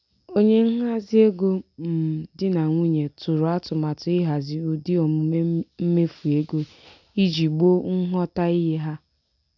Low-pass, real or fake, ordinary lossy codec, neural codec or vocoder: 7.2 kHz; real; none; none